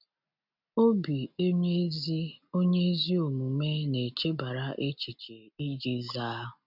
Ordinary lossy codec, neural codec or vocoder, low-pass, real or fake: none; none; 5.4 kHz; real